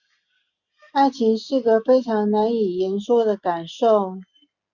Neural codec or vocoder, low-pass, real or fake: none; 7.2 kHz; real